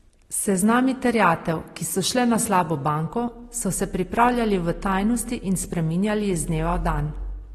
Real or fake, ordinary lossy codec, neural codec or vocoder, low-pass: real; AAC, 32 kbps; none; 19.8 kHz